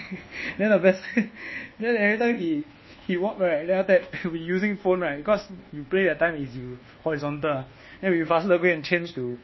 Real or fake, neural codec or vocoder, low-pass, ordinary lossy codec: fake; codec, 24 kHz, 1.2 kbps, DualCodec; 7.2 kHz; MP3, 24 kbps